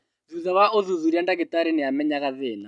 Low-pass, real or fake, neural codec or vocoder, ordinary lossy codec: 10.8 kHz; real; none; none